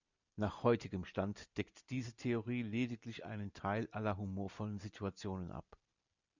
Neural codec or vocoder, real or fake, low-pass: none; real; 7.2 kHz